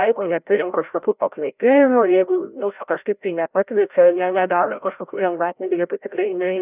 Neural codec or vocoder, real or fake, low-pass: codec, 16 kHz, 0.5 kbps, FreqCodec, larger model; fake; 3.6 kHz